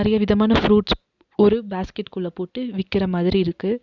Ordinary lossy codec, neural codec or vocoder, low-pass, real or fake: Opus, 64 kbps; none; 7.2 kHz; real